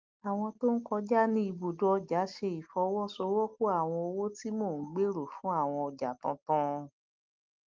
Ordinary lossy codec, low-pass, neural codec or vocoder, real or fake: Opus, 32 kbps; 7.2 kHz; none; real